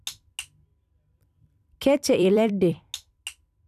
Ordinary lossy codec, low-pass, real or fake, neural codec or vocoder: none; 14.4 kHz; fake; vocoder, 44.1 kHz, 128 mel bands every 256 samples, BigVGAN v2